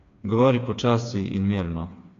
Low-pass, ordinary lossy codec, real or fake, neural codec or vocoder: 7.2 kHz; AAC, 64 kbps; fake; codec, 16 kHz, 4 kbps, FreqCodec, smaller model